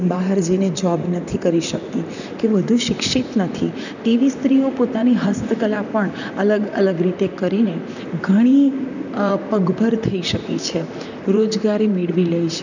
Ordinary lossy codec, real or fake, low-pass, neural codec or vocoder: none; fake; 7.2 kHz; vocoder, 44.1 kHz, 128 mel bands, Pupu-Vocoder